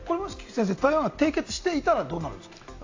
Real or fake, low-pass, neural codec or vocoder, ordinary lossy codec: real; 7.2 kHz; none; AAC, 48 kbps